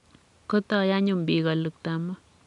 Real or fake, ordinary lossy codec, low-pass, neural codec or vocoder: real; none; 10.8 kHz; none